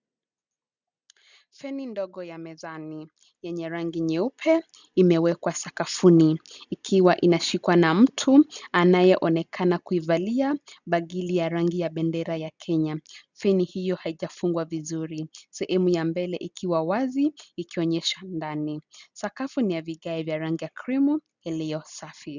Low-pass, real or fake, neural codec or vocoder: 7.2 kHz; real; none